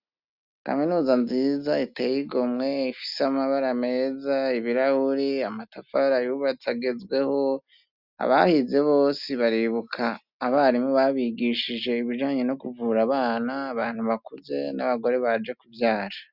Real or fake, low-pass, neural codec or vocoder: real; 5.4 kHz; none